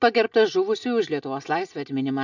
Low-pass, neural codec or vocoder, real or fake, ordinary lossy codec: 7.2 kHz; none; real; MP3, 64 kbps